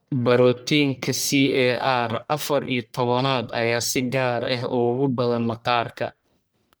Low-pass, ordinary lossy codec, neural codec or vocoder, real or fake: none; none; codec, 44.1 kHz, 1.7 kbps, Pupu-Codec; fake